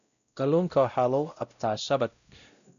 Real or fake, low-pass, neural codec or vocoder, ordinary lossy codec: fake; 7.2 kHz; codec, 16 kHz, 0.5 kbps, X-Codec, WavLM features, trained on Multilingual LibriSpeech; none